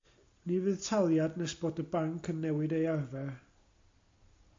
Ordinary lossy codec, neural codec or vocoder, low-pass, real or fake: AAC, 48 kbps; none; 7.2 kHz; real